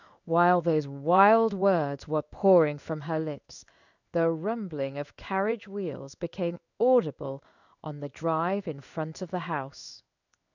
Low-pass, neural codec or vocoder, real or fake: 7.2 kHz; codec, 16 kHz in and 24 kHz out, 1 kbps, XY-Tokenizer; fake